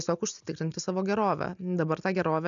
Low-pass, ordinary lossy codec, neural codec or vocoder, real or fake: 7.2 kHz; MP3, 96 kbps; none; real